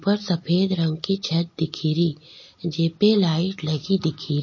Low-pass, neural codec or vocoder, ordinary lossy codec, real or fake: 7.2 kHz; none; MP3, 32 kbps; real